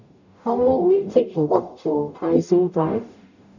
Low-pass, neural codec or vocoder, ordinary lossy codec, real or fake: 7.2 kHz; codec, 44.1 kHz, 0.9 kbps, DAC; none; fake